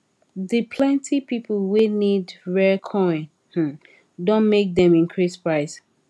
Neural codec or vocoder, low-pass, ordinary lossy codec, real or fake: none; none; none; real